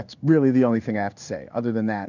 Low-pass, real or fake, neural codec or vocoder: 7.2 kHz; fake; codec, 16 kHz in and 24 kHz out, 1 kbps, XY-Tokenizer